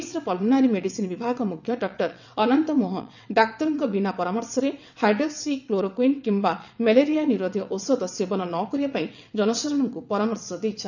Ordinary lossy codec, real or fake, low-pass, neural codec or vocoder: none; fake; 7.2 kHz; vocoder, 22.05 kHz, 80 mel bands, WaveNeXt